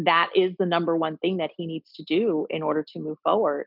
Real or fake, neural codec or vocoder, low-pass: real; none; 5.4 kHz